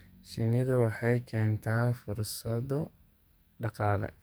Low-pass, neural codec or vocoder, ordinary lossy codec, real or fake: none; codec, 44.1 kHz, 2.6 kbps, SNAC; none; fake